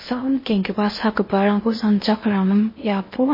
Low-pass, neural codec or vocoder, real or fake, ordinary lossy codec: 5.4 kHz; codec, 16 kHz in and 24 kHz out, 0.6 kbps, FocalCodec, streaming, 4096 codes; fake; MP3, 24 kbps